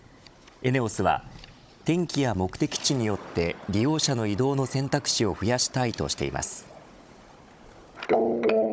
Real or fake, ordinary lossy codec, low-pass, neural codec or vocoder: fake; none; none; codec, 16 kHz, 16 kbps, FunCodec, trained on Chinese and English, 50 frames a second